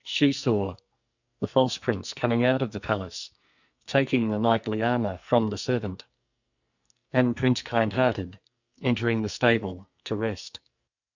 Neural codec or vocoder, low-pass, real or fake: codec, 44.1 kHz, 2.6 kbps, SNAC; 7.2 kHz; fake